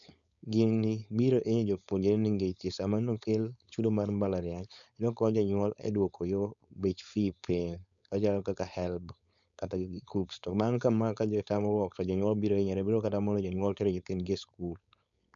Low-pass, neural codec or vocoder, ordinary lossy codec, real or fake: 7.2 kHz; codec, 16 kHz, 4.8 kbps, FACodec; none; fake